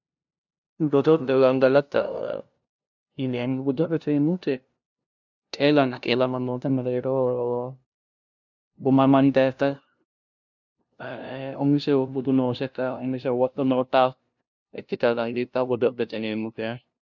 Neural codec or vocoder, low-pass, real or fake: codec, 16 kHz, 0.5 kbps, FunCodec, trained on LibriTTS, 25 frames a second; 7.2 kHz; fake